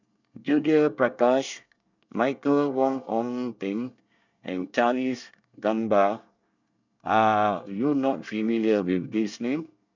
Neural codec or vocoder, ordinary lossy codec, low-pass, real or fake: codec, 24 kHz, 1 kbps, SNAC; none; 7.2 kHz; fake